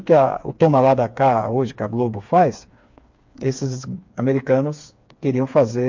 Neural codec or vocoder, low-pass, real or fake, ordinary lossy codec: codec, 16 kHz, 4 kbps, FreqCodec, smaller model; 7.2 kHz; fake; MP3, 48 kbps